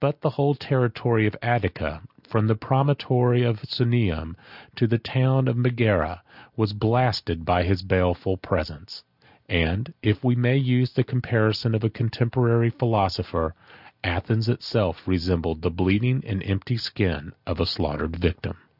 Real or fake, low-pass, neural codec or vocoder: real; 5.4 kHz; none